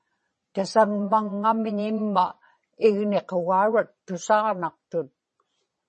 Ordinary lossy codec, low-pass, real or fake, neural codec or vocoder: MP3, 32 kbps; 9.9 kHz; fake; vocoder, 22.05 kHz, 80 mel bands, Vocos